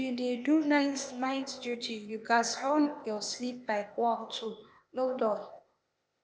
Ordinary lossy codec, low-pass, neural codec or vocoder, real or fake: none; none; codec, 16 kHz, 0.8 kbps, ZipCodec; fake